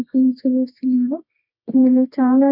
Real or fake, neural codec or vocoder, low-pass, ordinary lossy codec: fake; codec, 24 kHz, 0.9 kbps, WavTokenizer, medium music audio release; 5.4 kHz; none